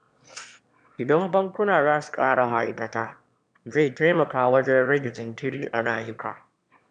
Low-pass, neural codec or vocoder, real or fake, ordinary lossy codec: 9.9 kHz; autoencoder, 22.05 kHz, a latent of 192 numbers a frame, VITS, trained on one speaker; fake; none